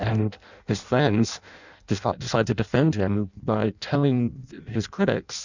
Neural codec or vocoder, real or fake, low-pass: codec, 16 kHz in and 24 kHz out, 0.6 kbps, FireRedTTS-2 codec; fake; 7.2 kHz